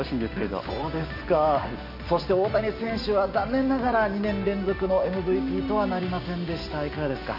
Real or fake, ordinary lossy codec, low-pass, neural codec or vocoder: real; none; 5.4 kHz; none